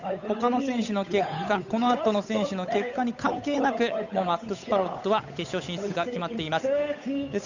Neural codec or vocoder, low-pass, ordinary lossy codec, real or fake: codec, 16 kHz, 8 kbps, FunCodec, trained on Chinese and English, 25 frames a second; 7.2 kHz; none; fake